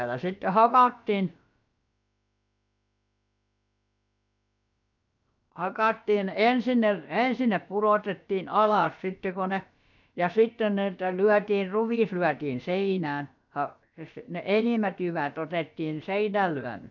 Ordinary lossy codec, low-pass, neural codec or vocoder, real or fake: none; 7.2 kHz; codec, 16 kHz, about 1 kbps, DyCAST, with the encoder's durations; fake